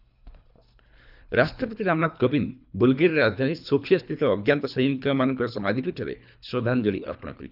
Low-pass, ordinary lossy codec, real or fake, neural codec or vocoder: 5.4 kHz; none; fake; codec, 24 kHz, 3 kbps, HILCodec